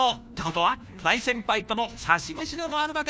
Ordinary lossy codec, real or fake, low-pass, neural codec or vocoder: none; fake; none; codec, 16 kHz, 1 kbps, FunCodec, trained on LibriTTS, 50 frames a second